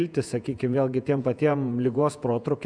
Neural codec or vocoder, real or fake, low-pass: vocoder, 48 kHz, 128 mel bands, Vocos; fake; 9.9 kHz